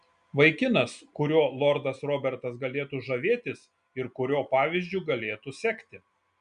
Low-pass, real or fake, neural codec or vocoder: 9.9 kHz; real; none